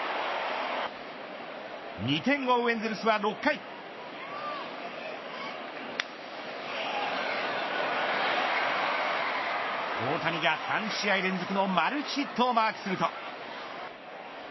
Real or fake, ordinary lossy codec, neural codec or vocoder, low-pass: fake; MP3, 24 kbps; codec, 44.1 kHz, 7.8 kbps, Pupu-Codec; 7.2 kHz